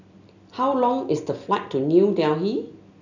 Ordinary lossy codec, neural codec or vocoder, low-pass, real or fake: none; none; 7.2 kHz; real